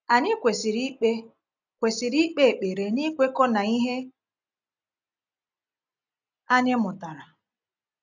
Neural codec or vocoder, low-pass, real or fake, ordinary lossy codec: none; 7.2 kHz; real; none